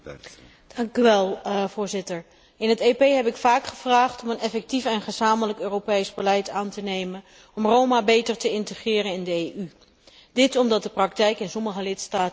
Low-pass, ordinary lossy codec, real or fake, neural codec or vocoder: none; none; real; none